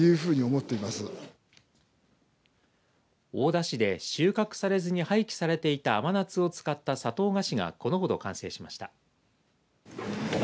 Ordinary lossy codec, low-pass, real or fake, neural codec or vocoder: none; none; real; none